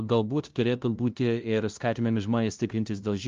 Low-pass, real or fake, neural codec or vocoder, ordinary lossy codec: 7.2 kHz; fake; codec, 16 kHz, 0.5 kbps, FunCodec, trained on LibriTTS, 25 frames a second; Opus, 32 kbps